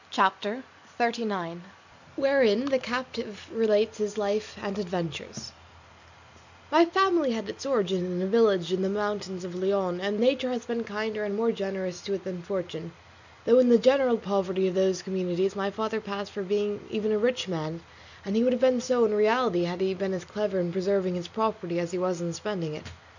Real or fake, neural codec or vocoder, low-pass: real; none; 7.2 kHz